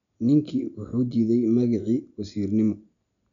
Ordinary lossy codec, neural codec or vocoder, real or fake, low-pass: none; none; real; 7.2 kHz